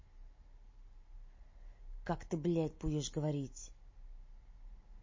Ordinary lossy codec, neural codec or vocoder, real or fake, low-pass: MP3, 32 kbps; none; real; 7.2 kHz